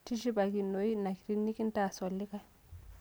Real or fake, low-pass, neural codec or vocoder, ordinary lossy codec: real; none; none; none